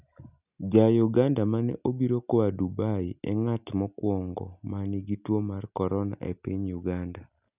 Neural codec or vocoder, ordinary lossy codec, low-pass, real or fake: none; none; 3.6 kHz; real